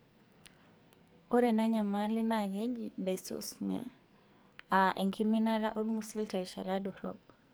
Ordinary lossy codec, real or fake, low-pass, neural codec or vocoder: none; fake; none; codec, 44.1 kHz, 2.6 kbps, SNAC